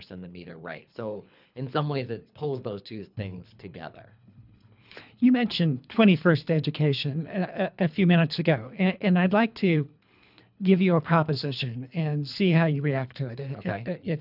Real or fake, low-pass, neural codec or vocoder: fake; 5.4 kHz; codec, 24 kHz, 3 kbps, HILCodec